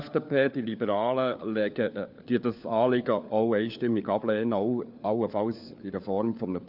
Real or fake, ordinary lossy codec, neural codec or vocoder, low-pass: fake; none; codec, 16 kHz, 4 kbps, FunCodec, trained on LibriTTS, 50 frames a second; 5.4 kHz